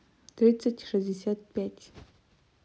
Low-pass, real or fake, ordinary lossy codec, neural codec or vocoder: none; real; none; none